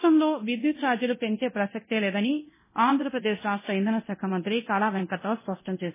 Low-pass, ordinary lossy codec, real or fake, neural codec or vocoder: 3.6 kHz; MP3, 16 kbps; fake; codec, 24 kHz, 0.9 kbps, DualCodec